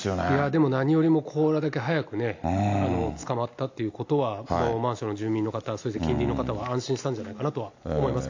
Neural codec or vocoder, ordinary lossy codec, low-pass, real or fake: none; AAC, 48 kbps; 7.2 kHz; real